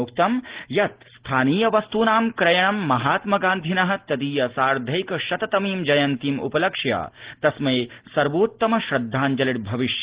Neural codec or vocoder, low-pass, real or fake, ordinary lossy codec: none; 3.6 kHz; real; Opus, 16 kbps